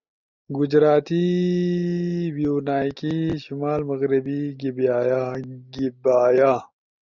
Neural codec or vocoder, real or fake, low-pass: none; real; 7.2 kHz